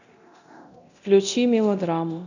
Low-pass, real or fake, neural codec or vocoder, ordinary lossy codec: 7.2 kHz; fake; codec, 24 kHz, 0.9 kbps, DualCodec; none